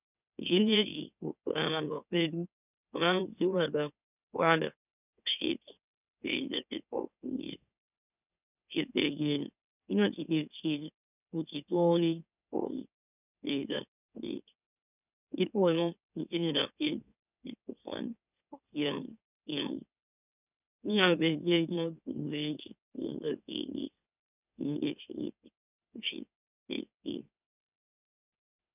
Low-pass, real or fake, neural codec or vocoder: 3.6 kHz; fake; autoencoder, 44.1 kHz, a latent of 192 numbers a frame, MeloTTS